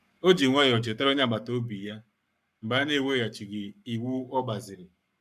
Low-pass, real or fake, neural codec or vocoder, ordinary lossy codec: 14.4 kHz; fake; codec, 44.1 kHz, 7.8 kbps, Pupu-Codec; none